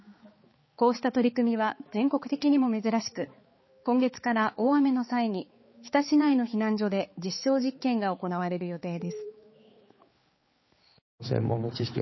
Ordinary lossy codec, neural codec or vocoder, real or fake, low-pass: MP3, 24 kbps; codec, 16 kHz, 4 kbps, X-Codec, HuBERT features, trained on balanced general audio; fake; 7.2 kHz